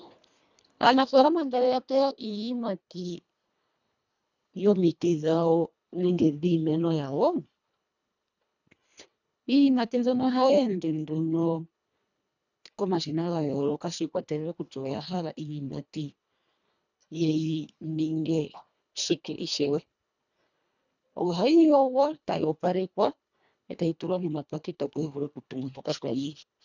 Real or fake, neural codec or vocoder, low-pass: fake; codec, 24 kHz, 1.5 kbps, HILCodec; 7.2 kHz